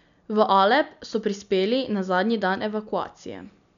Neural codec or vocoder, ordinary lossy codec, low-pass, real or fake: none; none; 7.2 kHz; real